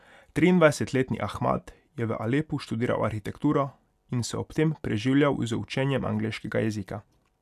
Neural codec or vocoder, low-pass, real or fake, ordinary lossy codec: none; 14.4 kHz; real; none